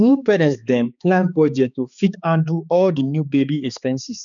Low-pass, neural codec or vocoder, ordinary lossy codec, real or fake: 7.2 kHz; codec, 16 kHz, 2 kbps, X-Codec, HuBERT features, trained on balanced general audio; none; fake